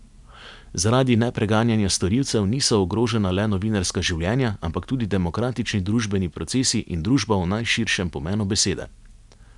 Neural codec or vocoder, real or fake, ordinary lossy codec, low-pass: none; real; none; 10.8 kHz